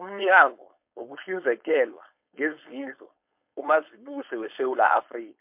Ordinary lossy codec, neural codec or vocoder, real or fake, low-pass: MP3, 32 kbps; codec, 16 kHz, 4.8 kbps, FACodec; fake; 3.6 kHz